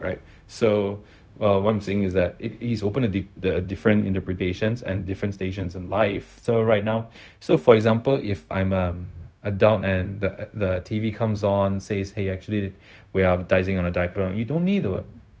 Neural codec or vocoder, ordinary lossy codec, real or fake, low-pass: codec, 16 kHz, 0.4 kbps, LongCat-Audio-Codec; none; fake; none